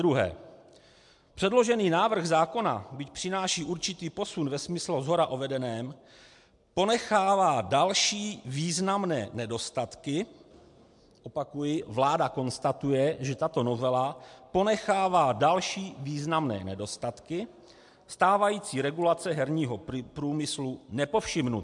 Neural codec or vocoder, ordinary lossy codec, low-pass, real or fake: none; MP3, 64 kbps; 10.8 kHz; real